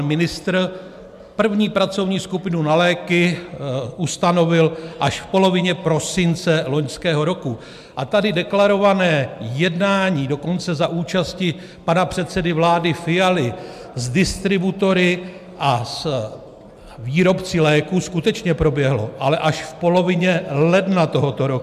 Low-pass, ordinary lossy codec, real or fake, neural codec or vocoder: 14.4 kHz; MP3, 96 kbps; real; none